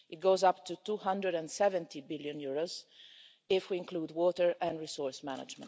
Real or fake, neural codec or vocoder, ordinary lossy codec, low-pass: real; none; none; none